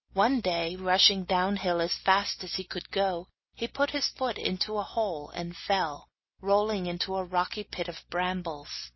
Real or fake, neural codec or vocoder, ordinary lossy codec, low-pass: real; none; MP3, 24 kbps; 7.2 kHz